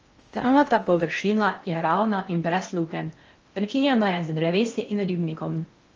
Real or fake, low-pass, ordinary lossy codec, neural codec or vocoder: fake; 7.2 kHz; Opus, 24 kbps; codec, 16 kHz in and 24 kHz out, 0.8 kbps, FocalCodec, streaming, 65536 codes